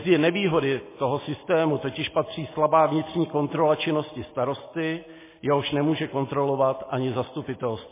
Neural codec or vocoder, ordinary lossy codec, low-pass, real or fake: none; MP3, 16 kbps; 3.6 kHz; real